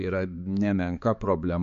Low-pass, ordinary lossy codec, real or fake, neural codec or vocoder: 7.2 kHz; MP3, 48 kbps; fake; codec, 16 kHz, 4 kbps, X-Codec, HuBERT features, trained on balanced general audio